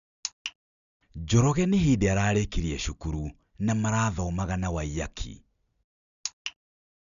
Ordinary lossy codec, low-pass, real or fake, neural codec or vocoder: none; 7.2 kHz; real; none